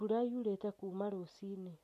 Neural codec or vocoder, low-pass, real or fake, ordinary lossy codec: none; 14.4 kHz; real; MP3, 64 kbps